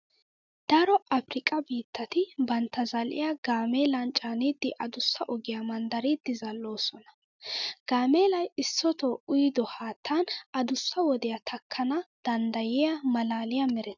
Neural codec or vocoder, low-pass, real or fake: none; 7.2 kHz; real